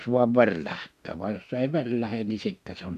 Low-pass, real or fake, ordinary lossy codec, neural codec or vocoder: 14.4 kHz; fake; none; autoencoder, 48 kHz, 32 numbers a frame, DAC-VAE, trained on Japanese speech